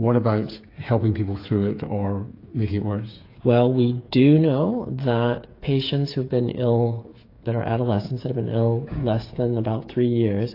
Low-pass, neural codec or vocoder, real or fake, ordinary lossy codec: 5.4 kHz; codec, 16 kHz, 8 kbps, FreqCodec, smaller model; fake; AAC, 32 kbps